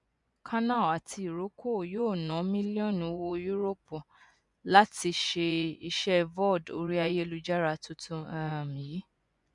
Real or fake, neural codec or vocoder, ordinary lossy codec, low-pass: fake; vocoder, 24 kHz, 100 mel bands, Vocos; MP3, 96 kbps; 10.8 kHz